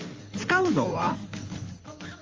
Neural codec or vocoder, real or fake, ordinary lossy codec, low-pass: codec, 16 kHz in and 24 kHz out, 2.2 kbps, FireRedTTS-2 codec; fake; Opus, 32 kbps; 7.2 kHz